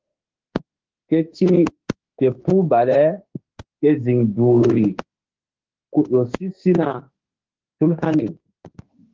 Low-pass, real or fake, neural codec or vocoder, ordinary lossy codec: 7.2 kHz; fake; autoencoder, 48 kHz, 32 numbers a frame, DAC-VAE, trained on Japanese speech; Opus, 16 kbps